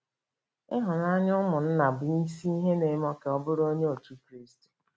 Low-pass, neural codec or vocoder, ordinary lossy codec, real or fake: none; none; none; real